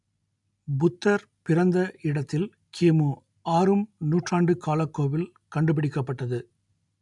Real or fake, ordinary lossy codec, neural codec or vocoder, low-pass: real; none; none; 10.8 kHz